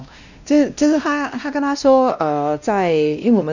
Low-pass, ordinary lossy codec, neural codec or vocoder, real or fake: 7.2 kHz; none; codec, 16 kHz, 1 kbps, X-Codec, WavLM features, trained on Multilingual LibriSpeech; fake